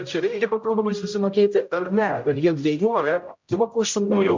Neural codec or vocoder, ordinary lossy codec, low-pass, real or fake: codec, 16 kHz, 0.5 kbps, X-Codec, HuBERT features, trained on general audio; MP3, 48 kbps; 7.2 kHz; fake